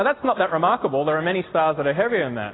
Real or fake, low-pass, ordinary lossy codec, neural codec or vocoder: real; 7.2 kHz; AAC, 16 kbps; none